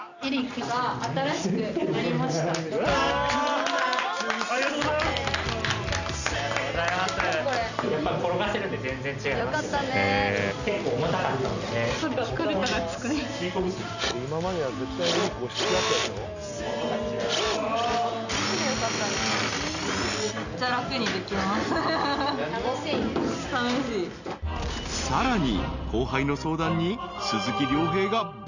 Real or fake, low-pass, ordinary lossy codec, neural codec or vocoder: real; 7.2 kHz; none; none